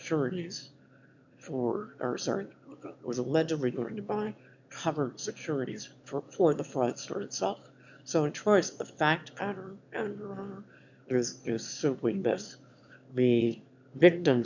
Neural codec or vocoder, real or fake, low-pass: autoencoder, 22.05 kHz, a latent of 192 numbers a frame, VITS, trained on one speaker; fake; 7.2 kHz